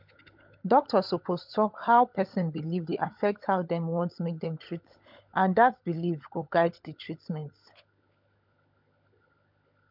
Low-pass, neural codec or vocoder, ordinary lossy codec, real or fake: 5.4 kHz; codec, 16 kHz, 16 kbps, FunCodec, trained on LibriTTS, 50 frames a second; MP3, 48 kbps; fake